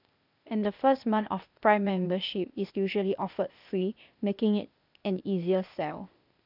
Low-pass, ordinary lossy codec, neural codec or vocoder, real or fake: 5.4 kHz; none; codec, 16 kHz, 0.8 kbps, ZipCodec; fake